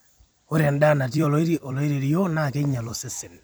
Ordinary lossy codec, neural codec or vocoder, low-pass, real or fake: none; vocoder, 44.1 kHz, 128 mel bands every 256 samples, BigVGAN v2; none; fake